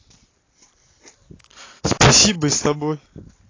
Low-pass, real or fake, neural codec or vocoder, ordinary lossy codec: 7.2 kHz; real; none; AAC, 32 kbps